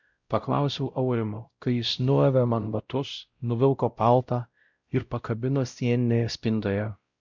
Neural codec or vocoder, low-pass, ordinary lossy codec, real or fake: codec, 16 kHz, 0.5 kbps, X-Codec, WavLM features, trained on Multilingual LibriSpeech; 7.2 kHz; Opus, 64 kbps; fake